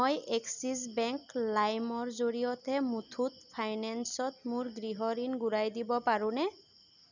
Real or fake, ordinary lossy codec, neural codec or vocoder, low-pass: real; none; none; 7.2 kHz